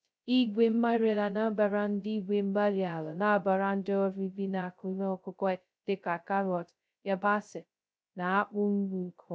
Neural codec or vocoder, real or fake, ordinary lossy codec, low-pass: codec, 16 kHz, 0.2 kbps, FocalCodec; fake; none; none